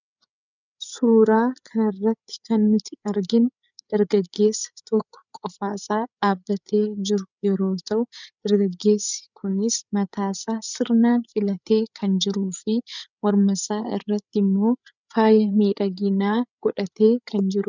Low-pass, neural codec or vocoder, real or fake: 7.2 kHz; codec, 16 kHz, 8 kbps, FreqCodec, larger model; fake